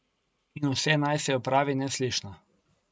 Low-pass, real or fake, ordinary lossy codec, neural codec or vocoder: none; real; none; none